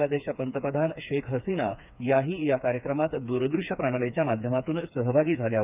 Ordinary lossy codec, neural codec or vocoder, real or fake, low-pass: none; codec, 16 kHz, 4 kbps, FreqCodec, smaller model; fake; 3.6 kHz